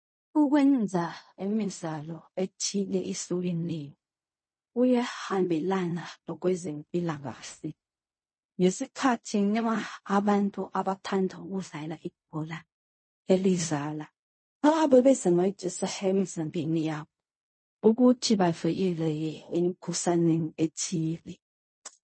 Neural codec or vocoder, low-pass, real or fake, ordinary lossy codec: codec, 16 kHz in and 24 kHz out, 0.4 kbps, LongCat-Audio-Codec, fine tuned four codebook decoder; 9.9 kHz; fake; MP3, 32 kbps